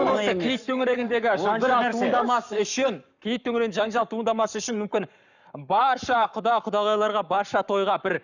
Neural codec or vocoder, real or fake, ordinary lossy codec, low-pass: codec, 44.1 kHz, 7.8 kbps, Pupu-Codec; fake; none; 7.2 kHz